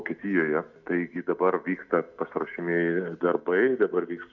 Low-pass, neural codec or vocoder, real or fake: 7.2 kHz; none; real